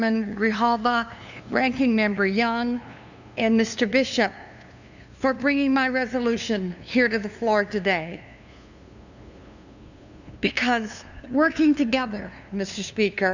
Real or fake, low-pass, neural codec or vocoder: fake; 7.2 kHz; codec, 16 kHz, 2 kbps, FunCodec, trained on LibriTTS, 25 frames a second